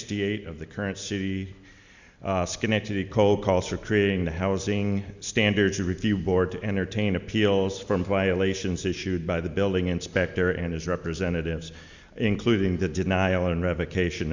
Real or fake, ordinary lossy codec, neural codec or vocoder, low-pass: real; Opus, 64 kbps; none; 7.2 kHz